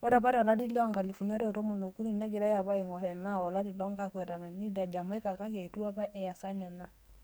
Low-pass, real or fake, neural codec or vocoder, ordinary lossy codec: none; fake; codec, 44.1 kHz, 2.6 kbps, SNAC; none